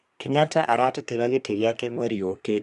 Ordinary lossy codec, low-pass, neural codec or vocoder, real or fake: none; 10.8 kHz; codec, 24 kHz, 1 kbps, SNAC; fake